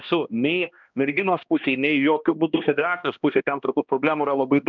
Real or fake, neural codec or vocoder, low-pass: fake; codec, 16 kHz, 1 kbps, X-Codec, HuBERT features, trained on balanced general audio; 7.2 kHz